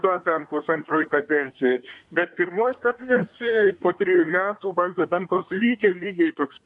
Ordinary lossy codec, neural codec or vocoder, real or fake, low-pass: AAC, 64 kbps; codec, 24 kHz, 1 kbps, SNAC; fake; 10.8 kHz